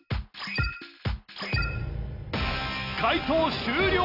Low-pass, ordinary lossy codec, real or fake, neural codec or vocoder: 5.4 kHz; none; real; none